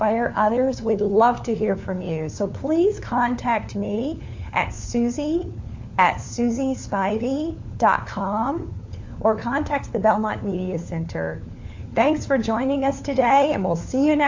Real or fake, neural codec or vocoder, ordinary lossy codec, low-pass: fake; codec, 16 kHz, 4 kbps, FunCodec, trained on LibriTTS, 50 frames a second; AAC, 48 kbps; 7.2 kHz